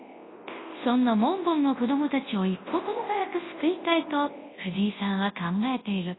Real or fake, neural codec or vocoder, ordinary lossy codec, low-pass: fake; codec, 24 kHz, 0.9 kbps, WavTokenizer, large speech release; AAC, 16 kbps; 7.2 kHz